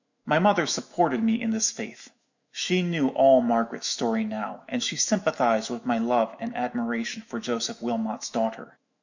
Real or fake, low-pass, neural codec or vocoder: fake; 7.2 kHz; autoencoder, 48 kHz, 128 numbers a frame, DAC-VAE, trained on Japanese speech